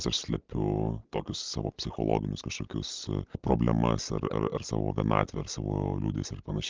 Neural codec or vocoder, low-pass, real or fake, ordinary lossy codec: none; 7.2 kHz; real; Opus, 24 kbps